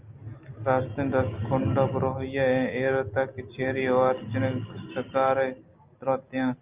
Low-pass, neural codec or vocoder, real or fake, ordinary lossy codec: 3.6 kHz; none; real; Opus, 24 kbps